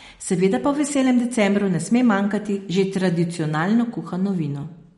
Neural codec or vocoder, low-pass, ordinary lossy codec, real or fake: none; 19.8 kHz; MP3, 48 kbps; real